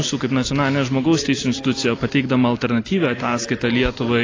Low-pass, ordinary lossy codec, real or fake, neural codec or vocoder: 7.2 kHz; AAC, 32 kbps; real; none